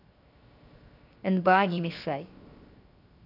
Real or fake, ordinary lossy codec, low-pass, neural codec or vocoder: fake; AAC, 48 kbps; 5.4 kHz; codec, 16 kHz, 0.7 kbps, FocalCodec